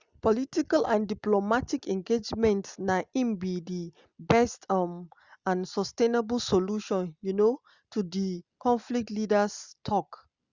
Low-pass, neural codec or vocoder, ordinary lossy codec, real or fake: 7.2 kHz; none; Opus, 64 kbps; real